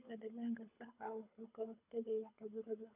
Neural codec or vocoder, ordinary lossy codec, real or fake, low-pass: codec, 24 kHz, 6 kbps, HILCodec; none; fake; 3.6 kHz